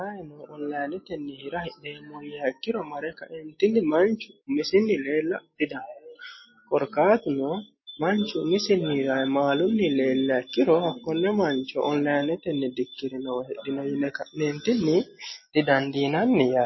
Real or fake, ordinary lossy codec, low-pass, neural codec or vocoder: real; MP3, 24 kbps; 7.2 kHz; none